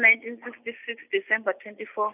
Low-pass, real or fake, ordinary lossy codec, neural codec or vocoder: 3.6 kHz; real; none; none